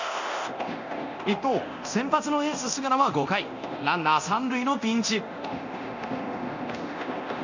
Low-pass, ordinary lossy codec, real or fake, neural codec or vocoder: 7.2 kHz; none; fake; codec, 24 kHz, 0.9 kbps, DualCodec